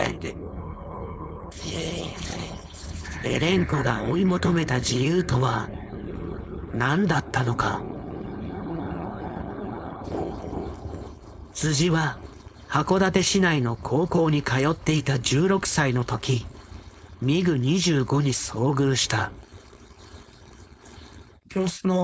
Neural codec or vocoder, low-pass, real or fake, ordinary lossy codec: codec, 16 kHz, 4.8 kbps, FACodec; none; fake; none